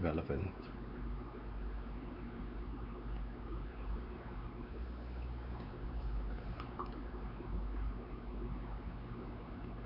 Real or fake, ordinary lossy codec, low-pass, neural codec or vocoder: fake; Opus, 64 kbps; 5.4 kHz; codec, 16 kHz, 4 kbps, X-Codec, WavLM features, trained on Multilingual LibriSpeech